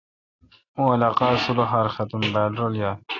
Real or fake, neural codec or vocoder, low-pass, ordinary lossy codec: real; none; 7.2 kHz; AAC, 32 kbps